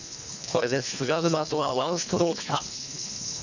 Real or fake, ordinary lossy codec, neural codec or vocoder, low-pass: fake; none; codec, 24 kHz, 1.5 kbps, HILCodec; 7.2 kHz